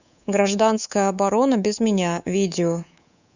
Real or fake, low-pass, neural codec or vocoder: fake; 7.2 kHz; codec, 24 kHz, 3.1 kbps, DualCodec